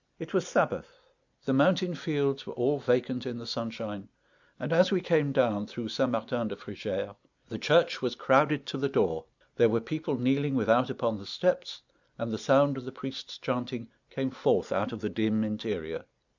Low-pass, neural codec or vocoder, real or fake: 7.2 kHz; none; real